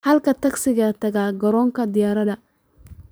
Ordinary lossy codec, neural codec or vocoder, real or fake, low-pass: none; vocoder, 44.1 kHz, 128 mel bands every 512 samples, BigVGAN v2; fake; none